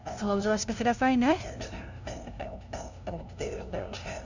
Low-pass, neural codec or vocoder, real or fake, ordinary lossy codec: 7.2 kHz; codec, 16 kHz, 0.5 kbps, FunCodec, trained on LibriTTS, 25 frames a second; fake; none